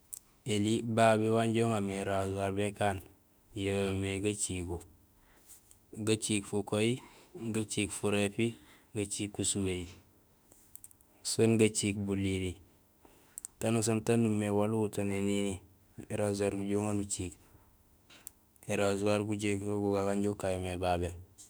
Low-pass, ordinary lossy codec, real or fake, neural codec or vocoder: none; none; fake; autoencoder, 48 kHz, 32 numbers a frame, DAC-VAE, trained on Japanese speech